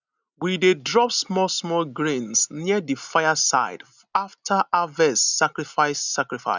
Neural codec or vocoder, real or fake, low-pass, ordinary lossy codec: none; real; 7.2 kHz; none